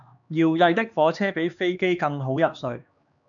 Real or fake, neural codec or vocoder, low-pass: fake; codec, 16 kHz, 2 kbps, X-Codec, HuBERT features, trained on LibriSpeech; 7.2 kHz